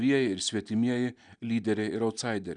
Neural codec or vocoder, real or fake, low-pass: none; real; 9.9 kHz